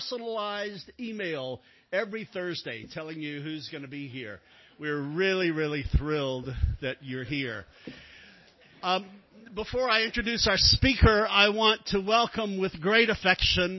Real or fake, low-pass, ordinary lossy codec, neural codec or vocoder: real; 7.2 kHz; MP3, 24 kbps; none